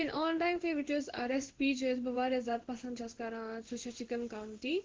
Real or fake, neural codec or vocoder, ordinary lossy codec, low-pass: fake; codec, 16 kHz in and 24 kHz out, 1 kbps, XY-Tokenizer; Opus, 16 kbps; 7.2 kHz